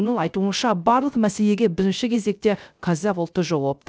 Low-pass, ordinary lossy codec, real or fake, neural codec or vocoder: none; none; fake; codec, 16 kHz, 0.3 kbps, FocalCodec